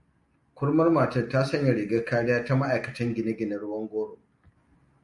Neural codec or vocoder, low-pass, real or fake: none; 10.8 kHz; real